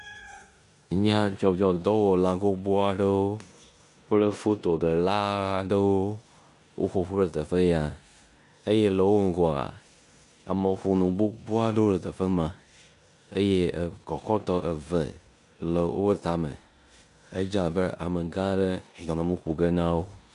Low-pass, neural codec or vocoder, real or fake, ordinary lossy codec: 10.8 kHz; codec, 16 kHz in and 24 kHz out, 0.9 kbps, LongCat-Audio-Codec, four codebook decoder; fake; MP3, 64 kbps